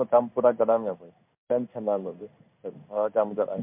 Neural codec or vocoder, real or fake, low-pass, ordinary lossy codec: codec, 16 kHz in and 24 kHz out, 1 kbps, XY-Tokenizer; fake; 3.6 kHz; MP3, 32 kbps